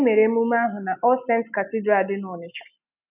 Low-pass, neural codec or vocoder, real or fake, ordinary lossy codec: 3.6 kHz; none; real; none